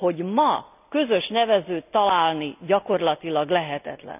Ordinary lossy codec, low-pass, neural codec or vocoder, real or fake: none; 3.6 kHz; none; real